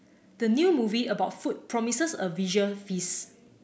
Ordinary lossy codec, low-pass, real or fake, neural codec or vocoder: none; none; real; none